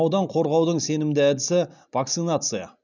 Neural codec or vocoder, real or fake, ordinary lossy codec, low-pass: none; real; none; 7.2 kHz